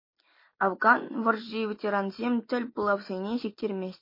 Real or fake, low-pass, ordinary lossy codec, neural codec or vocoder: real; 5.4 kHz; MP3, 24 kbps; none